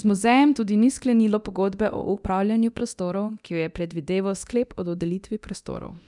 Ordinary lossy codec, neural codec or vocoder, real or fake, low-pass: none; codec, 24 kHz, 0.9 kbps, DualCodec; fake; 10.8 kHz